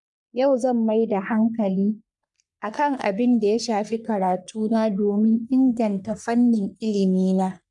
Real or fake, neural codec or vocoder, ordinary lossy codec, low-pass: fake; codec, 44.1 kHz, 3.4 kbps, Pupu-Codec; none; 10.8 kHz